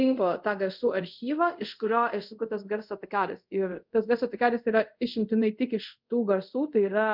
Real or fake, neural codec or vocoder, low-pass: fake; codec, 24 kHz, 0.5 kbps, DualCodec; 5.4 kHz